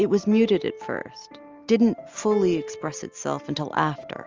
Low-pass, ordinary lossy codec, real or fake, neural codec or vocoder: 7.2 kHz; Opus, 32 kbps; real; none